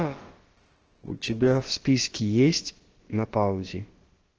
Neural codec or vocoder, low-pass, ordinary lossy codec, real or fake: codec, 16 kHz, about 1 kbps, DyCAST, with the encoder's durations; 7.2 kHz; Opus, 16 kbps; fake